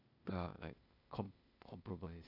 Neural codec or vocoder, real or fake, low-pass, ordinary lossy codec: codec, 16 kHz, 0.8 kbps, ZipCodec; fake; 5.4 kHz; none